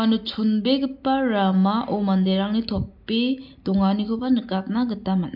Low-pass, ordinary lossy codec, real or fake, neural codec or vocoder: 5.4 kHz; AAC, 48 kbps; real; none